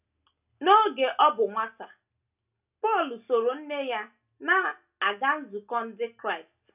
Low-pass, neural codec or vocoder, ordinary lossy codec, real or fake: 3.6 kHz; none; none; real